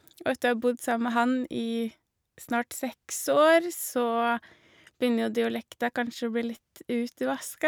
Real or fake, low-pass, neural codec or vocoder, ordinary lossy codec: real; none; none; none